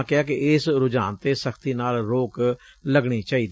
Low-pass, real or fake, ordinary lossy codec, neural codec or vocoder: none; real; none; none